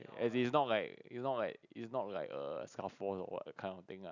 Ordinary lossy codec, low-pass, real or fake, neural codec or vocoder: none; 7.2 kHz; real; none